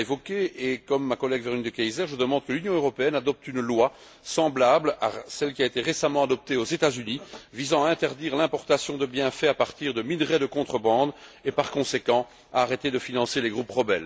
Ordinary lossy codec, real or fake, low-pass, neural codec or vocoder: none; real; none; none